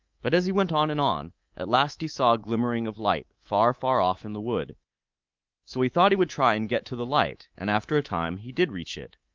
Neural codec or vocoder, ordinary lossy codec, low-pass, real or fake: codec, 24 kHz, 3.1 kbps, DualCodec; Opus, 24 kbps; 7.2 kHz; fake